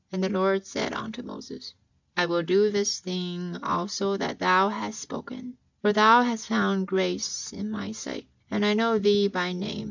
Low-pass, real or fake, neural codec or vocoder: 7.2 kHz; real; none